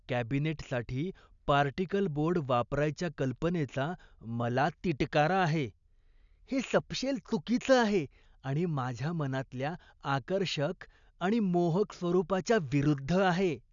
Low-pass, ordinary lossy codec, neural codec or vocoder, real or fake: 7.2 kHz; none; none; real